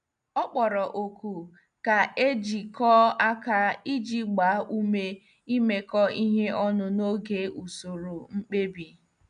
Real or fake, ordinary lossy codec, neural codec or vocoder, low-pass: real; AAC, 96 kbps; none; 9.9 kHz